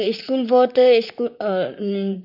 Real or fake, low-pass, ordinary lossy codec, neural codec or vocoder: fake; 5.4 kHz; none; vocoder, 44.1 kHz, 128 mel bands, Pupu-Vocoder